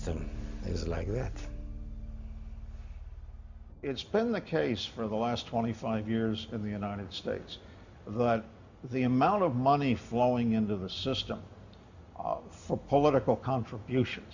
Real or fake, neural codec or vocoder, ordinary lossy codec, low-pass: real; none; Opus, 64 kbps; 7.2 kHz